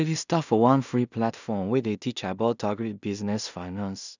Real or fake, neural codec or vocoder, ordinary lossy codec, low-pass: fake; codec, 16 kHz in and 24 kHz out, 0.4 kbps, LongCat-Audio-Codec, two codebook decoder; none; 7.2 kHz